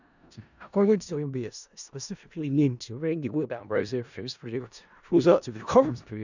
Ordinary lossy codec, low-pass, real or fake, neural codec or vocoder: none; 7.2 kHz; fake; codec, 16 kHz in and 24 kHz out, 0.4 kbps, LongCat-Audio-Codec, four codebook decoder